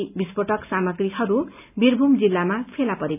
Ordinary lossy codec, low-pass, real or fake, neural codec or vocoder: none; 3.6 kHz; real; none